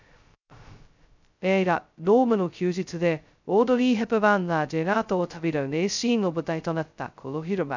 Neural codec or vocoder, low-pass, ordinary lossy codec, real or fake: codec, 16 kHz, 0.2 kbps, FocalCodec; 7.2 kHz; none; fake